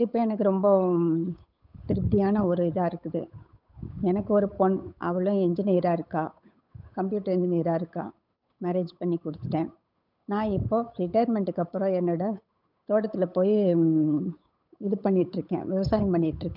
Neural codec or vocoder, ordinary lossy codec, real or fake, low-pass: codec, 16 kHz, 8 kbps, FunCodec, trained on Chinese and English, 25 frames a second; none; fake; 5.4 kHz